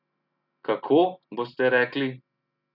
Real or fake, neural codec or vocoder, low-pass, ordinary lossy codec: real; none; 5.4 kHz; none